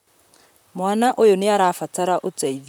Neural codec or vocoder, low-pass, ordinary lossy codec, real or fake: vocoder, 44.1 kHz, 128 mel bands, Pupu-Vocoder; none; none; fake